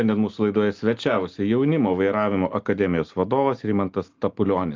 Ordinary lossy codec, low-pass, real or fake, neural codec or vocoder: Opus, 24 kbps; 7.2 kHz; real; none